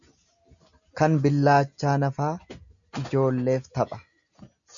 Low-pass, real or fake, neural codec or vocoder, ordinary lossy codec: 7.2 kHz; real; none; MP3, 96 kbps